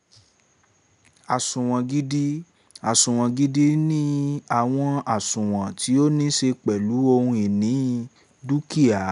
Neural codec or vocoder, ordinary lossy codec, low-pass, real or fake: none; none; 10.8 kHz; real